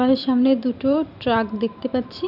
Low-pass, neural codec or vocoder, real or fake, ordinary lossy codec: 5.4 kHz; vocoder, 44.1 kHz, 128 mel bands every 512 samples, BigVGAN v2; fake; none